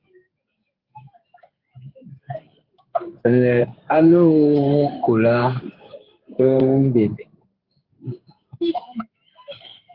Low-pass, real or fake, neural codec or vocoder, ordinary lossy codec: 5.4 kHz; fake; codec, 16 kHz in and 24 kHz out, 1 kbps, XY-Tokenizer; Opus, 24 kbps